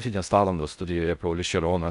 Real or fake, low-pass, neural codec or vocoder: fake; 10.8 kHz; codec, 16 kHz in and 24 kHz out, 0.6 kbps, FocalCodec, streaming, 4096 codes